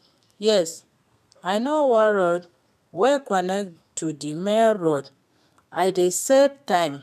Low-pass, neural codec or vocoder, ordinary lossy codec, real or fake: 14.4 kHz; codec, 32 kHz, 1.9 kbps, SNAC; none; fake